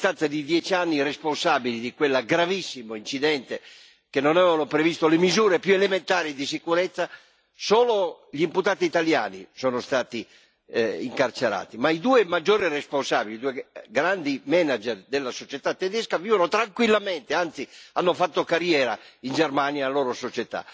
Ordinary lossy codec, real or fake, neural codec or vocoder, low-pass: none; real; none; none